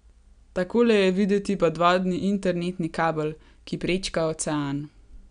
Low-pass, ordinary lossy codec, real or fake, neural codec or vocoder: 9.9 kHz; none; real; none